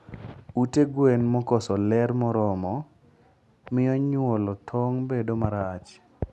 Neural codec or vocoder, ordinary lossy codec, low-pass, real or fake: none; none; none; real